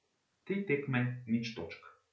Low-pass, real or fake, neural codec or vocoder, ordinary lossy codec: none; real; none; none